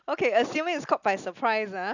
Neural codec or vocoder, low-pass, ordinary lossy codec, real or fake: none; 7.2 kHz; none; real